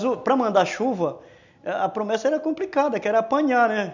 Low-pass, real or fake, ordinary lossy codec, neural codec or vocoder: 7.2 kHz; real; none; none